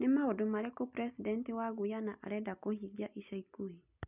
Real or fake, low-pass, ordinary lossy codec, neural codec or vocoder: real; 3.6 kHz; none; none